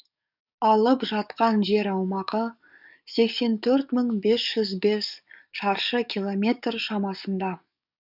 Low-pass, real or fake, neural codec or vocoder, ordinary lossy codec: 5.4 kHz; fake; codec, 44.1 kHz, 7.8 kbps, DAC; none